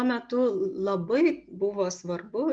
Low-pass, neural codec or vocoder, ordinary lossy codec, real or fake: 9.9 kHz; none; Opus, 64 kbps; real